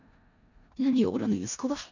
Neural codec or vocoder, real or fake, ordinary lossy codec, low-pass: codec, 16 kHz in and 24 kHz out, 0.4 kbps, LongCat-Audio-Codec, four codebook decoder; fake; none; 7.2 kHz